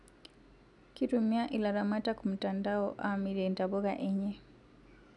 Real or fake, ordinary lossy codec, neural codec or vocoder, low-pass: real; none; none; 10.8 kHz